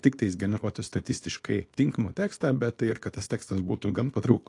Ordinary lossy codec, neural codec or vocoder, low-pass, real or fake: AAC, 48 kbps; codec, 24 kHz, 0.9 kbps, WavTokenizer, small release; 10.8 kHz; fake